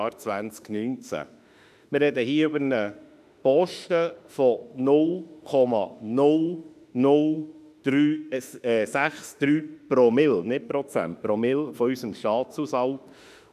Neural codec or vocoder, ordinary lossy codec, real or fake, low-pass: autoencoder, 48 kHz, 32 numbers a frame, DAC-VAE, trained on Japanese speech; none; fake; 14.4 kHz